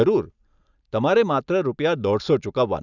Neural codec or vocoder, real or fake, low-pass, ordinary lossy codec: none; real; 7.2 kHz; none